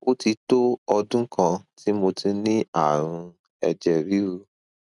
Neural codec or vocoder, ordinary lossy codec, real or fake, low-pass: none; none; real; 10.8 kHz